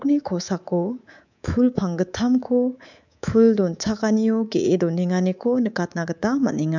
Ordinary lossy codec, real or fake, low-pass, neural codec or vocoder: none; fake; 7.2 kHz; codec, 24 kHz, 3.1 kbps, DualCodec